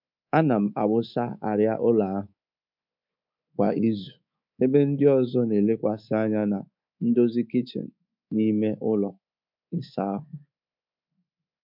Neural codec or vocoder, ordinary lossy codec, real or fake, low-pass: codec, 24 kHz, 3.1 kbps, DualCodec; MP3, 48 kbps; fake; 5.4 kHz